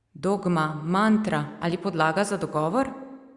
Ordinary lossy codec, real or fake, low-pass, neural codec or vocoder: Opus, 64 kbps; real; 10.8 kHz; none